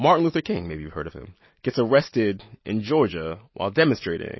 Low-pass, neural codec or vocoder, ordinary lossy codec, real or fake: 7.2 kHz; none; MP3, 24 kbps; real